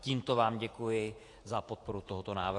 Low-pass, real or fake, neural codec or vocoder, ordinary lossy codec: 10.8 kHz; fake; vocoder, 48 kHz, 128 mel bands, Vocos; AAC, 48 kbps